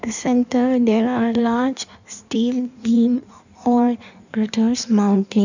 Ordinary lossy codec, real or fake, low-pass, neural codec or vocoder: none; fake; 7.2 kHz; codec, 16 kHz in and 24 kHz out, 1.1 kbps, FireRedTTS-2 codec